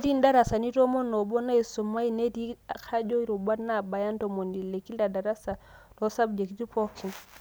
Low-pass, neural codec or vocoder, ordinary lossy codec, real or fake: none; none; none; real